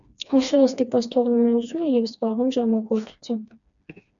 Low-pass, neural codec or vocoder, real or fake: 7.2 kHz; codec, 16 kHz, 2 kbps, FreqCodec, smaller model; fake